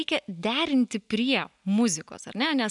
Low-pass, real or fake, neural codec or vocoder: 10.8 kHz; real; none